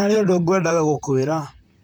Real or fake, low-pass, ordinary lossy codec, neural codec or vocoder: fake; none; none; vocoder, 44.1 kHz, 128 mel bands, Pupu-Vocoder